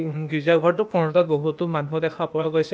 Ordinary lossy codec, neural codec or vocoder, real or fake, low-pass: none; codec, 16 kHz, 0.8 kbps, ZipCodec; fake; none